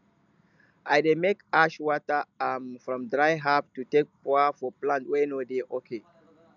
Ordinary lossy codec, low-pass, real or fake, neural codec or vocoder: none; 7.2 kHz; real; none